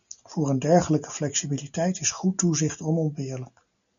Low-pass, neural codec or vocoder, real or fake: 7.2 kHz; none; real